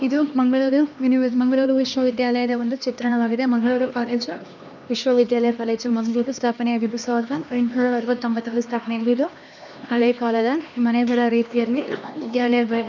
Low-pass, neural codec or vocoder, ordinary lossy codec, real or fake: 7.2 kHz; codec, 16 kHz, 2 kbps, X-Codec, HuBERT features, trained on LibriSpeech; none; fake